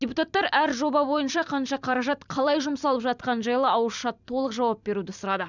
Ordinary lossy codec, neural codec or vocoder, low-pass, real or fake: none; vocoder, 44.1 kHz, 128 mel bands every 256 samples, BigVGAN v2; 7.2 kHz; fake